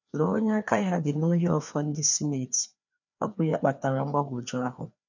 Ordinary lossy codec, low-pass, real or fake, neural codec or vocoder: none; 7.2 kHz; fake; codec, 16 kHz, 2 kbps, FreqCodec, larger model